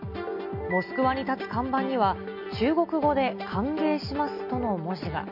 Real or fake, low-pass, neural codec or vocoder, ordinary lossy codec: real; 5.4 kHz; none; none